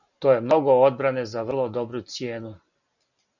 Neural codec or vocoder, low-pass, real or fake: none; 7.2 kHz; real